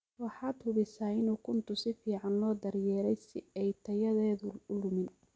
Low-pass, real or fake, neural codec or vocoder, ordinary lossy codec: none; real; none; none